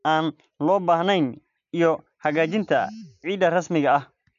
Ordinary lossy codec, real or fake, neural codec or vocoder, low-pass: none; real; none; 7.2 kHz